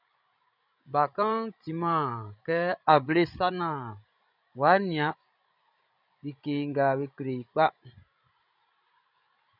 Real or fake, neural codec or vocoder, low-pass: fake; codec, 16 kHz, 16 kbps, FreqCodec, larger model; 5.4 kHz